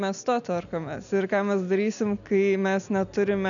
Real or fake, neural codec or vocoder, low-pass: real; none; 7.2 kHz